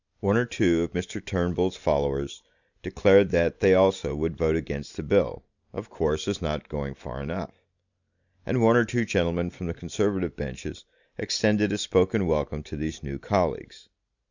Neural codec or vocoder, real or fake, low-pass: none; real; 7.2 kHz